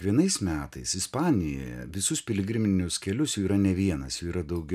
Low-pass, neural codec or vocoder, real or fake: 14.4 kHz; none; real